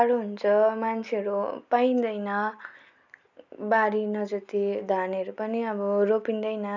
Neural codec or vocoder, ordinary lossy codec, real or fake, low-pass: none; none; real; 7.2 kHz